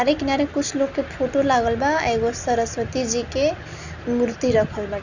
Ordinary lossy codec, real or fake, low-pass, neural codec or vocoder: none; real; 7.2 kHz; none